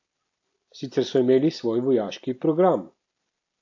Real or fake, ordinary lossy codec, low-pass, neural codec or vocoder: real; AAC, 48 kbps; 7.2 kHz; none